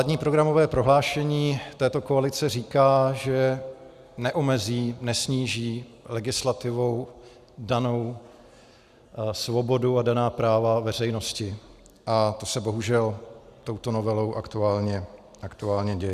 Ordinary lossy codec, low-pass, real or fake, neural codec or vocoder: Opus, 64 kbps; 14.4 kHz; real; none